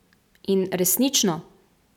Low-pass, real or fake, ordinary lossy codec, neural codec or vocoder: 19.8 kHz; real; none; none